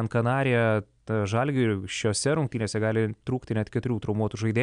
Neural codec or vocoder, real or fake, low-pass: none; real; 9.9 kHz